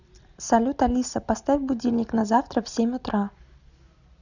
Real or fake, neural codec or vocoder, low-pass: real; none; 7.2 kHz